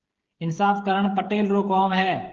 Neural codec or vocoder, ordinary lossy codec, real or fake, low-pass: codec, 16 kHz, 16 kbps, FreqCodec, smaller model; Opus, 16 kbps; fake; 7.2 kHz